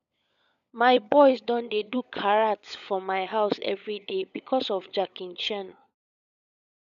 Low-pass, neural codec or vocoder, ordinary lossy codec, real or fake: 7.2 kHz; codec, 16 kHz, 16 kbps, FunCodec, trained on LibriTTS, 50 frames a second; none; fake